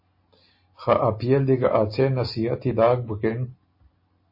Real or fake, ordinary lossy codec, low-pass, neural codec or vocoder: real; MP3, 24 kbps; 5.4 kHz; none